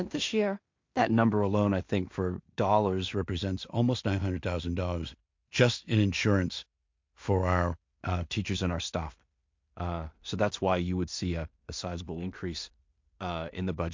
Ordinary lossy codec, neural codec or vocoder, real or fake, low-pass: MP3, 48 kbps; codec, 16 kHz in and 24 kHz out, 0.4 kbps, LongCat-Audio-Codec, two codebook decoder; fake; 7.2 kHz